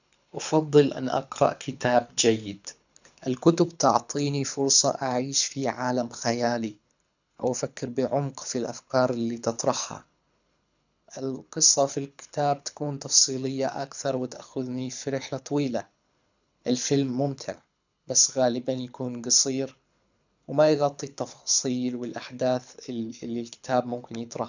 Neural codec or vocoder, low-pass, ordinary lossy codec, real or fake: codec, 24 kHz, 6 kbps, HILCodec; 7.2 kHz; none; fake